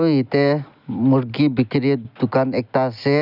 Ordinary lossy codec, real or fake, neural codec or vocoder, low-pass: none; real; none; 5.4 kHz